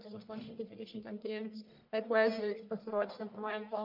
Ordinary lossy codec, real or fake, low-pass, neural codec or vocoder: MP3, 48 kbps; fake; 5.4 kHz; codec, 44.1 kHz, 1.7 kbps, Pupu-Codec